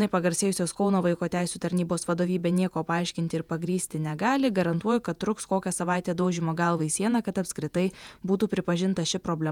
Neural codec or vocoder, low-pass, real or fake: vocoder, 48 kHz, 128 mel bands, Vocos; 19.8 kHz; fake